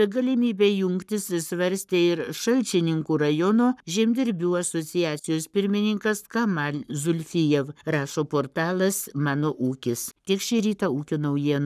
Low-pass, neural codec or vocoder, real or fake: 14.4 kHz; codec, 44.1 kHz, 7.8 kbps, Pupu-Codec; fake